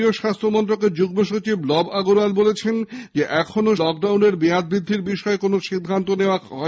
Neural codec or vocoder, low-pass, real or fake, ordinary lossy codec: none; none; real; none